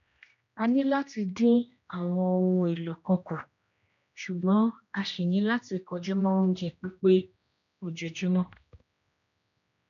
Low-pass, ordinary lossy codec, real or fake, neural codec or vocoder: 7.2 kHz; none; fake; codec, 16 kHz, 1 kbps, X-Codec, HuBERT features, trained on general audio